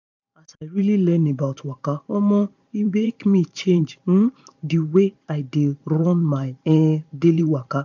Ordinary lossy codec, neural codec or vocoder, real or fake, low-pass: none; vocoder, 44.1 kHz, 128 mel bands every 512 samples, BigVGAN v2; fake; 7.2 kHz